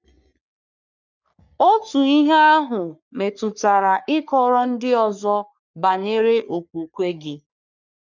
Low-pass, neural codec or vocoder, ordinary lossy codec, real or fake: 7.2 kHz; codec, 44.1 kHz, 3.4 kbps, Pupu-Codec; none; fake